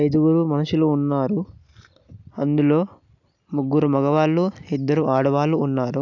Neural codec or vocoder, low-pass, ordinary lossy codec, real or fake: none; 7.2 kHz; none; real